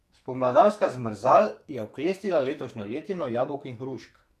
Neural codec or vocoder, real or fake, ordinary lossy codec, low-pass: codec, 44.1 kHz, 2.6 kbps, SNAC; fake; none; 14.4 kHz